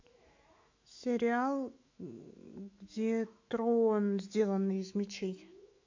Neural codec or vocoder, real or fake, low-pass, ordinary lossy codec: autoencoder, 48 kHz, 128 numbers a frame, DAC-VAE, trained on Japanese speech; fake; 7.2 kHz; MP3, 48 kbps